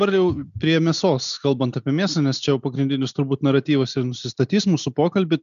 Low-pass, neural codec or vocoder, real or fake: 7.2 kHz; none; real